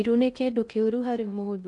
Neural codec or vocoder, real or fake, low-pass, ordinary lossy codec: codec, 16 kHz in and 24 kHz out, 0.6 kbps, FocalCodec, streaming, 2048 codes; fake; 10.8 kHz; none